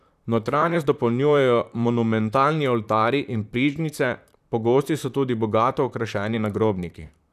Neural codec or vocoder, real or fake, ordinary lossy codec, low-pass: vocoder, 44.1 kHz, 128 mel bands, Pupu-Vocoder; fake; none; 14.4 kHz